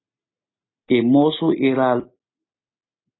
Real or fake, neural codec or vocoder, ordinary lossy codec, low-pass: real; none; AAC, 16 kbps; 7.2 kHz